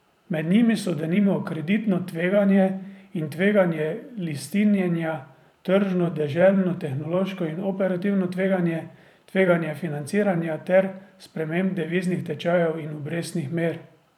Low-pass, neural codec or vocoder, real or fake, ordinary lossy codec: 19.8 kHz; vocoder, 44.1 kHz, 128 mel bands every 512 samples, BigVGAN v2; fake; none